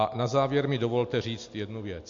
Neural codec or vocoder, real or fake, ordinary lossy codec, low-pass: none; real; MP3, 48 kbps; 7.2 kHz